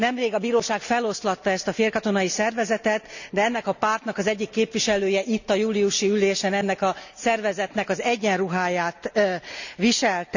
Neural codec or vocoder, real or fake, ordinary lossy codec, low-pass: none; real; none; 7.2 kHz